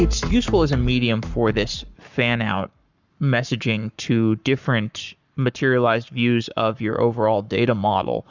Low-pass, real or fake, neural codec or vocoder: 7.2 kHz; fake; codec, 44.1 kHz, 7.8 kbps, Pupu-Codec